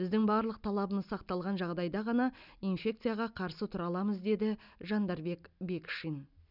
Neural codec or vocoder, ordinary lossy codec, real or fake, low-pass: none; none; real; 5.4 kHz